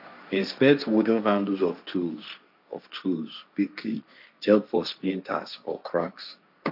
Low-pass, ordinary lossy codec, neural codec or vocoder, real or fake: 5.4 kHz; none; codec, 16 kHz, 1.1 kbps, Voila-Tokenizer; fake